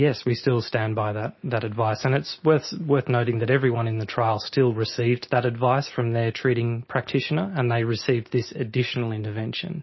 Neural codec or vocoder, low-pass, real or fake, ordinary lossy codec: none; 7.2 kHz; real; MP3, 24 kbps